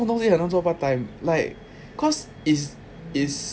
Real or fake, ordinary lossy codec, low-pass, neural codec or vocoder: real; none; none; none